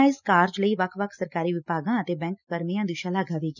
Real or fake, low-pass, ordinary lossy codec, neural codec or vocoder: real; 7.2 kHz; none; none